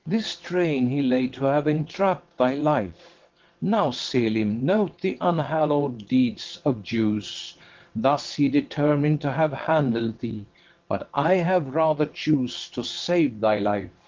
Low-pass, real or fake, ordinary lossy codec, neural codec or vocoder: 7.2 kHz; fake; Opus, 16 kbps; vocoder, 22.05 kHz, 80 mel bands, WaveNeXt